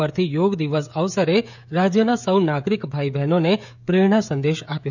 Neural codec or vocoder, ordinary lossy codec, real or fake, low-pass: codec, 16 kHz, 16 kbps, FreqCodec, smaller model; none; fake; 7.2 kHz